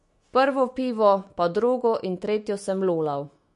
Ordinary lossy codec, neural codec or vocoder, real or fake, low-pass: MP3, 48 kbps; autoencoder, 48 kHz, 128 numbers a frame, DAC-VAE, trained on Japanese speech; fake; 14.4 kHz